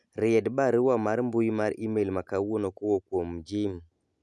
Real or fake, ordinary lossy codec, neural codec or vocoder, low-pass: real; none; none; none